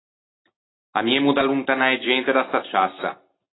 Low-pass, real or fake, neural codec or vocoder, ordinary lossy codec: 7.2 kHz; real; none; AAC, 16 kbps